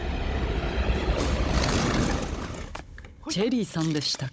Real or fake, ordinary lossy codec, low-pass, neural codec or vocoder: fake; none; none; codec, 16 kHz, 16 kbps, FunCodec, trained on Chinese and English, 50 frames a second